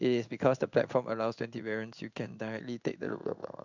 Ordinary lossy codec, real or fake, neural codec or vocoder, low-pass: none; fake; codec, 16 kHz in and 24 kHz out, 1 kbps, XY-Tokenizer; 7.2 kHz